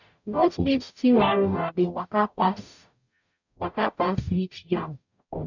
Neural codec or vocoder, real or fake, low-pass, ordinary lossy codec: codec, 44.1 kHz, 0.9 kbps, DAC; fake; 7.2 kHz; none